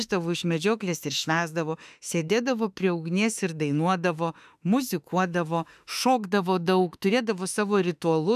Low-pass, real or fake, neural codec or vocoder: 14.4 kHz; fake; autoencoder, 48 kHz, 32 numbers a frame, DAC-VAE, trained on Japanese speech